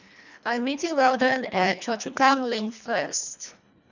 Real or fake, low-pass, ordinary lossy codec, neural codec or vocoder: fake; 7.2 kHz; none; codec, 24 kHz, 1.5 kbps, HILCodec